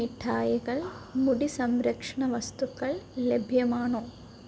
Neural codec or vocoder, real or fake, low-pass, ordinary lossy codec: none; real; none; none